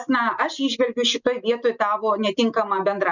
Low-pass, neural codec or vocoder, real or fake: 7.2 kHz; none; real